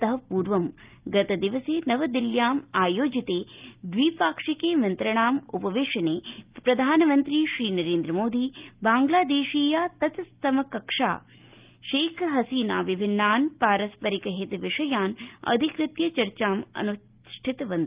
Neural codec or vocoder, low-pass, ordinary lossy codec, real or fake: none; 3.6 kHz; Opus, 24 kbps; real